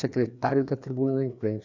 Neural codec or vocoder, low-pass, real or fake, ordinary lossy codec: codec, 16 kHz, 2 kbps, FreqCodec, larger model; 7.2 kHz; fake; none